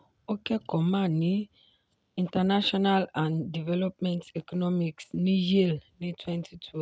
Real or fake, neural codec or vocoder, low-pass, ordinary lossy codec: real; none; none; none